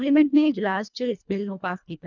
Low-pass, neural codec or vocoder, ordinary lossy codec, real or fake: 7.2 kHz; codec, 24 kHz, 1.5 kbps, HILCodec; none; fake